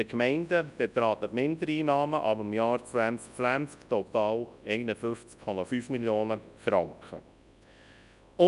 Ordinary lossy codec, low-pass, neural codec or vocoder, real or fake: none; 10.8 kHz; codec, 24 kHz, 0.9 kbps, WavTokenizer, large speech release; fake